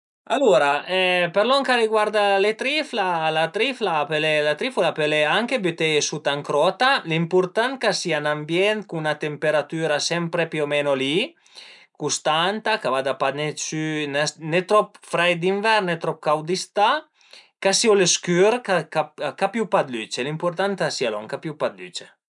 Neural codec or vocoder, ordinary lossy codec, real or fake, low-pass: none; none; real; 10.8 kHz